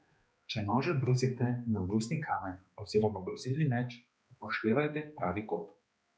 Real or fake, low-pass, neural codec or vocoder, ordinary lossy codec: fake; none; codec, 16 kHz, 2 kbps, X-Codec, HuBERT features, trained on balanced general audio; none